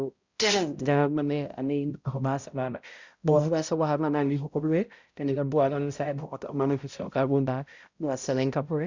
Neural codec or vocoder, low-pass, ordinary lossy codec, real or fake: codec, 16 kHz, 0.5 kbps, X-Codec, HuBERT features, trained on balanced general audio; 7.2 kHz; Opus, 64 kbps; fake